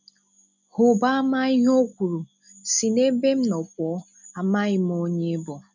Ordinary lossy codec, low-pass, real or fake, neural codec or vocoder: none; 7.2 kHz; real; none